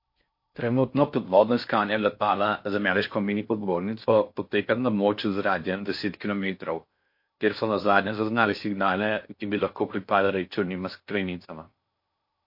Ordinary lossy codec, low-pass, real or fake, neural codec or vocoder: MP3, 32 kbps; 5.4 kHz; fake; codec, 16 kHz in and 24 kHz out, 0.6 kbps, FocalCodec, streaming, 4096 codes